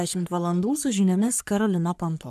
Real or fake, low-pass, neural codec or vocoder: fake; 14.4 kHz; codec, 44.1 kHz, 3.4 kbps, Pupu-Codec